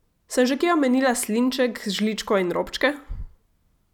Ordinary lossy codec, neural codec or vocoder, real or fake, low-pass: none; none; real; 19.8 kHz